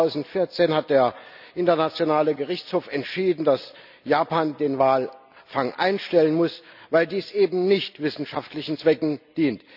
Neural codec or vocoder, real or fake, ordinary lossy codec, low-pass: none; real; none; 5.4 kHz